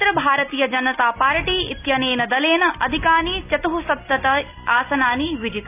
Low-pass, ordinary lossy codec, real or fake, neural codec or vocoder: 3.6 kHz; AAC, 32 kbps; real; none